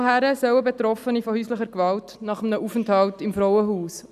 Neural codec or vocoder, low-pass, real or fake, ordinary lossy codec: none; 14.4 kHz; real; none